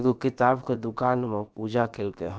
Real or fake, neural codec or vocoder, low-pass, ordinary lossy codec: fake; codec, 16 kHz, about 1 kbps, DyCAST, with the encoder's durations; none; none